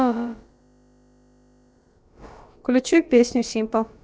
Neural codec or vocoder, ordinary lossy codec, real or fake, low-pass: codec, 16 kHz, about 1 kbps, DyCAST, with the encoder's durations; none; fake; none